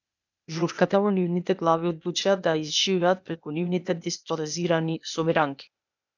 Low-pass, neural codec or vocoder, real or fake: 7.2 kHz; codec, 16 kHz, 0.8 kbps, ZipCodec; fake